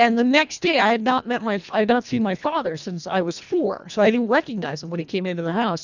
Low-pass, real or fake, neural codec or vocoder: 7.2 kHz; fake; codec, 24 kHz, 1.5 kbps, HILCodec